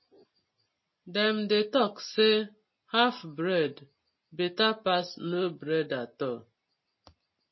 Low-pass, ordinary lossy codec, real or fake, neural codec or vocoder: 7.2 kHz; MP3, 24 kbps; real; none